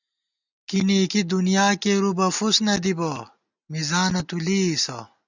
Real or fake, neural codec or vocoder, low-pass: real; none; 7.2 kHz